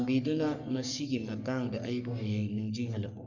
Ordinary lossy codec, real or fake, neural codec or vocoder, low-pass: none; fake; codec, 44.1 kHz, 3.4 kbps, Pupu-Codec; 7.2 kHz